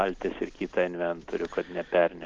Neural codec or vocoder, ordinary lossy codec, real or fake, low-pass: none; Opus, 16 kbps; real; 7.2 kHz